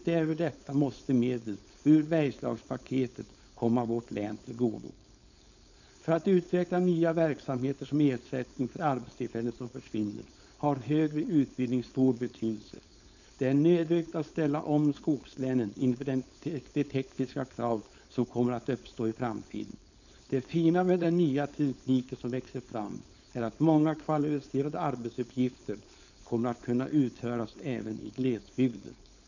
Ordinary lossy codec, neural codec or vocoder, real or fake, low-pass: none; codec, 16 kHz, 4.8 kbps, FACodec; fake; 7.2 kHz